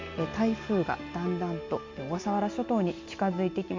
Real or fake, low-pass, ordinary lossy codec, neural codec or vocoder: real; 7.2 kHz; MP3, 48 kbps; none